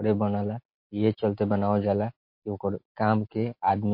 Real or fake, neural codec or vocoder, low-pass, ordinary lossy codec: real; none; 5.4 kHz; MP3, 32 kbps